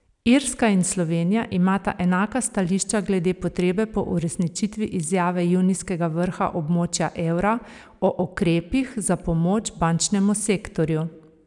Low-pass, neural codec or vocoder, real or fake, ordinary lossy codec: 10.8 kHz; vocoder, 44.1 kHz, 128 mel bands every 256 samples, BigVGAN v2; fake; none